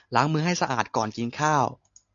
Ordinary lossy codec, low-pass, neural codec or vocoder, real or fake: AAC, 64 kbps; 7.2 kHz; none; real